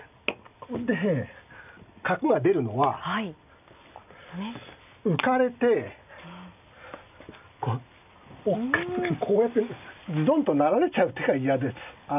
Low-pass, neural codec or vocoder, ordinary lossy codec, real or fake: 3.6 kHz; none; none; real